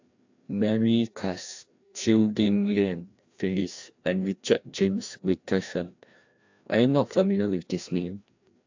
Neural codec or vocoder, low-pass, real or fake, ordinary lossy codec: codec, 16 kHz, 1 kbps, FreqCodec, larger model; 7.2 kHz; fake; AAC, 48 kbps